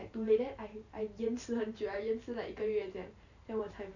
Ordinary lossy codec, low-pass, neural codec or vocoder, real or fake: none; 7.2 kHz; vocoder, 44.1 kHz, 128 mel bands every 512 samples, BigVGAN v2; fake